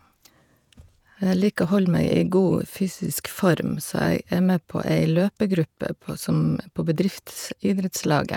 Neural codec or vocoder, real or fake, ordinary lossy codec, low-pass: vocoder, 48 kHz, 128 mel bands, Vocos; fake; none; 19.8 kHz